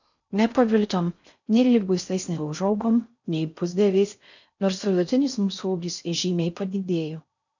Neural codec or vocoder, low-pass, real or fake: codec, 16 kHz in and 24 kHz out, 0.6 kbps, FocalCodec, streaming, 4096 codes; 7.2 kHz; fake